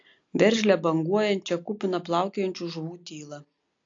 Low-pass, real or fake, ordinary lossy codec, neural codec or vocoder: 7.2 kHz; real; AAC, 48 kbps; none